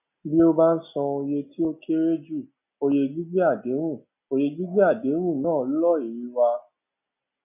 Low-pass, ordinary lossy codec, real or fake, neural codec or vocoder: 3.6 kHz; none; real; none